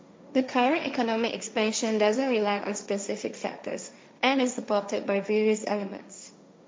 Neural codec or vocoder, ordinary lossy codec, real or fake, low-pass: codec, 16 kHz, 1.1 kbps, Voila-Tokenizer; none; fake; none